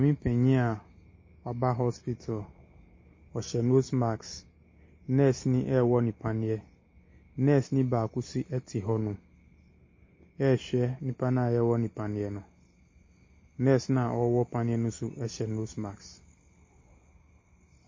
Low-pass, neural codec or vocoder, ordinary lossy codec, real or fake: 7.2 kHz; none; MP3, 32 kbps; real